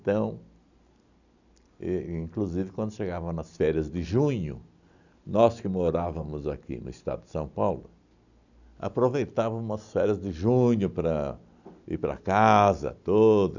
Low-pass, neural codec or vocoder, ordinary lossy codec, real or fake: 7.2 kHz; none; none; real